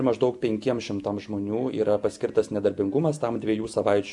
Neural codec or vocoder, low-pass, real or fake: none; 10.8 kHz; real